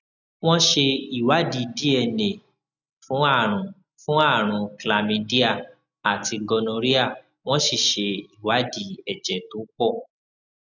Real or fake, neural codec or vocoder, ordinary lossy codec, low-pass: real; none; none; 7.2 kHz